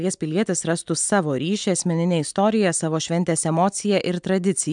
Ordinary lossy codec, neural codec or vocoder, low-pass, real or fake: MP3, 96 kbps; none; 9.9 kHz; real